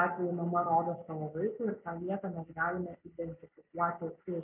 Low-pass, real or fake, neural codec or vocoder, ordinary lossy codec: 3.6 kHz; real; none; MP3, 32 kbps